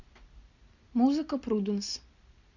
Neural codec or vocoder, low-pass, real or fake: none; 7.2 kHz; real